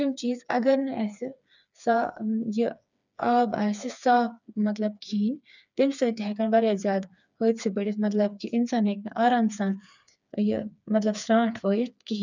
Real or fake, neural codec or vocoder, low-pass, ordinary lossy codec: fake; codec, 16 kHz, 4 kbps, FreqCodec, smaller model; 7.2 kHz; none